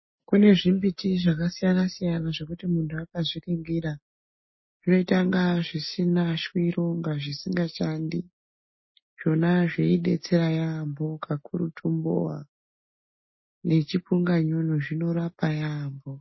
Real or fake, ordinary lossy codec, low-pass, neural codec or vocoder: real; MP3, 24 kbps; 7.2 kHz; none